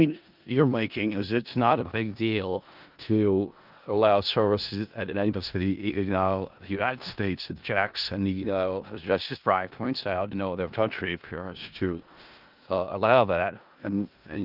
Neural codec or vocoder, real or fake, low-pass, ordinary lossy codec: codec, 16 kHz in and 24 kHz out, 0.4 kbps, LongCat-Audio-Codec, four codebook decoder; fake; 5.4 kHz; Opus, 24 kbps